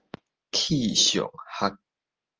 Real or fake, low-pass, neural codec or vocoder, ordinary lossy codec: real; 7.2 kHz; none; Opus, 24 kbps